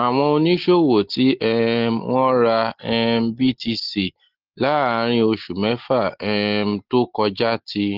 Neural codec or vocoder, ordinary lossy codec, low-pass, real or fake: none; Opus, 16 kbps; 5.4 kHz; real